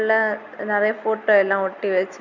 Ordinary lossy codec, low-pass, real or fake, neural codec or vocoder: none; 7.2 kHz; real; none